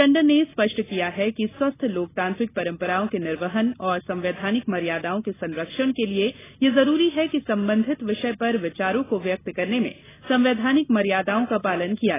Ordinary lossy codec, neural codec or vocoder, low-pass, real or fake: AAC, 16 kbps; none; 3.6 kHz; real